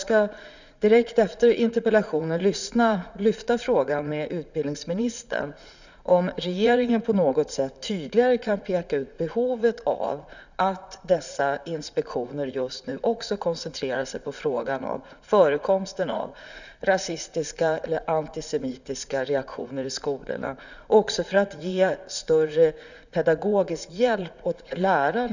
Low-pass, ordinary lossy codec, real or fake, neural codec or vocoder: 7.2 kHz; none; fake; vocoder, 44.1 kHz, 80 mel bands, Vocos